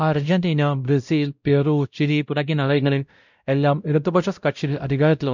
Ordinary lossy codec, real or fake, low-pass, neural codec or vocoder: none; fake; 7.2 kHz; codec, 16 kHz, 0.5 kbps, X-Codec, WavLM features, trained on Multilingual LibriSpeech